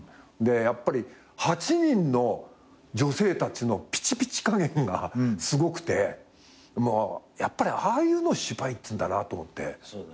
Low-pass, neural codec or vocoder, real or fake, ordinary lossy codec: none; none; real; none